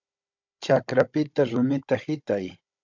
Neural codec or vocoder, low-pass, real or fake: codec, 16 kHz, 16 kbps, FunCodec, trained on Chinese and English, 50 frames a second; 7.2 kHz; fake